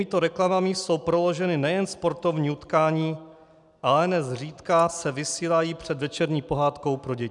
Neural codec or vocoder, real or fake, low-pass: none; real; 10.8 kHz